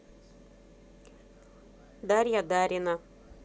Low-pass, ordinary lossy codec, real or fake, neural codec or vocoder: none; none; real; none